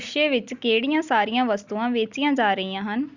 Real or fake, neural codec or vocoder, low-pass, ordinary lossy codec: real; none; 7.2 kHz; Opus, 64 kbps